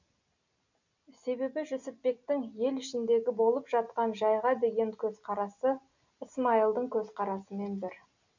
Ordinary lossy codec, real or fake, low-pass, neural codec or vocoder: none; real; 7.2 kHz; none